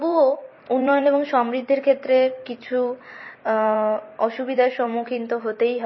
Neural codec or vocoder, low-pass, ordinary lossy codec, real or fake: vocoder, 44.1 kHz, 128 mel bands every 256 samples, BigVGAN v2; 7.2 kHz; MP3, 24 kbps; fake